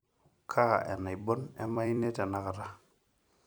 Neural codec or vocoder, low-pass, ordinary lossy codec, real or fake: vocoder, 44.1 kHz, 128 mel bands every 256 samples, BigVGAN v2; none; none; fake